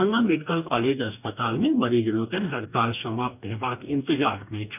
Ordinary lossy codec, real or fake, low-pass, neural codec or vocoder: none; fake; 3.6 kHz; codec, 44.1 kHz, 2.6 kbps, DAC